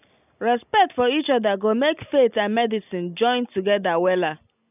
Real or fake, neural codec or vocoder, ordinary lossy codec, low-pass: real; none; none; 3.6 kHz